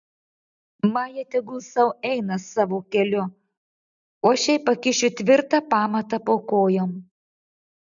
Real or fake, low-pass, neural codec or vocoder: real; 7.2 kHz; none